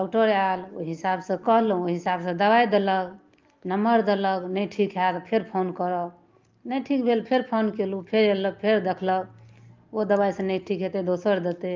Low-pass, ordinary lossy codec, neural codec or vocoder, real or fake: 7.2 kHz; Opus, 32 kbps; none; real